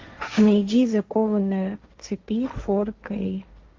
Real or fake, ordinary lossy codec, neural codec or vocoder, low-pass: fake; Opus, 32 kbps; codec, 16 kHz, 1.1 kbps, Voila-Tokenizer; 7.2 kHz